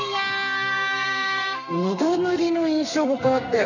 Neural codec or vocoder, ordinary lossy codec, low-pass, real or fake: codec, 32 kHz, 1.9 kbps, SNAC; none; 7.2 kHz; fake